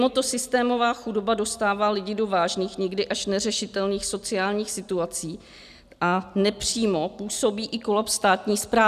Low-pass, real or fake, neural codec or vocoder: 14.4 kHz; real; none